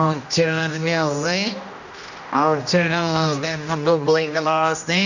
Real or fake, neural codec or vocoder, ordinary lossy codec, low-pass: fake; codec, 16 kHz, 1 kbps, X-Codec, HuBERT features, trained on general audio; none; 7.2 kHz